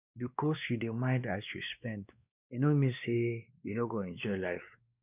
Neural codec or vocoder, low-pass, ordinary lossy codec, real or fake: codec, 16 kHz, 2 kbps, X-Codec, WavLM features, trained on Multilingual LibriSpeech; 3.6 kHz; none; fake